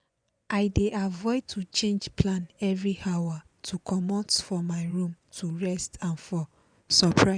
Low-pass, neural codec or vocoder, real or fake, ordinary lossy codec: 9.9 kHz; vocoder, 24 kHz, 100 mel bands, Vocos; fake; none